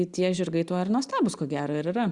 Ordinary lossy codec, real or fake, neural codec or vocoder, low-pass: Opus, 64 kbps; real; none; 10.8 kHz